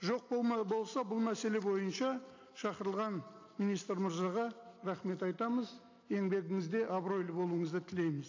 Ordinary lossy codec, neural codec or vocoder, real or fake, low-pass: AAC, 48 kbps; none; real; 7.2 kHz